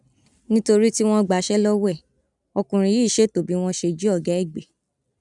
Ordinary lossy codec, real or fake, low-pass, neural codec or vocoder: none; real; 10.8 kHz; none